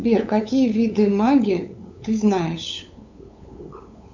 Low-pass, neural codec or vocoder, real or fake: 7.2 kHz; codec, 16 kHz, 8 kbps, FunCodec, trained on LibriTTS, 25 frames a second; fake